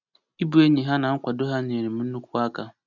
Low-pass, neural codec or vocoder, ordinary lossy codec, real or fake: 7.2 kHz; none; none; real